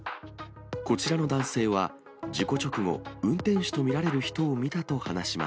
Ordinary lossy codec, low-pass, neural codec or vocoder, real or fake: none; none; none; real